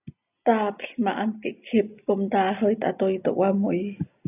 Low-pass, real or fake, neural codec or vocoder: 3.6 kHz; real; none